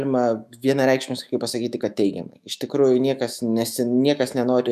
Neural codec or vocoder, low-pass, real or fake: autoencoder, 48 kHz, 128 numbers a frame, DAC-VAE, trained on Japanese speech; 14.4 kHz; fake